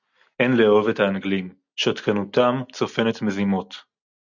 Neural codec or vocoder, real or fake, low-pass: none; real; 7.2 kHz